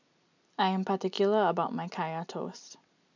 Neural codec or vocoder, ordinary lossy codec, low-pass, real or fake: none; none; 7.2 kHz; real